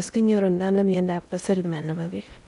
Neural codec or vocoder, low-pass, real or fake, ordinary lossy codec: codec, 16 kHz in and 24 kHz out, 0.6 kbps, FocalCodec, streaming, 2048 codes; 10.8 kHz; fake; none